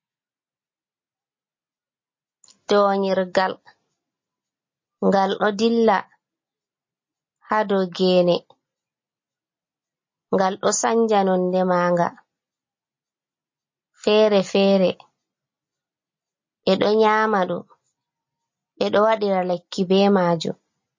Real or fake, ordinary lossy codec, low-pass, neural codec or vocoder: real; MP3, 32 kbps; 7.2 kHz; none